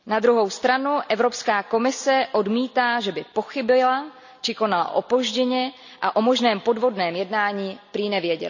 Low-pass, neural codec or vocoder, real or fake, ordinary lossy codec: 7.2 kHz; none; real; none